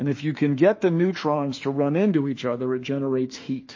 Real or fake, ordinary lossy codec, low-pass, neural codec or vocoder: fake; MP3, 32 kbps; 7.2 kHz; autoencoder, 48 kHz, 32 numbers a frame, DAC-VAE, trained on Japanese speech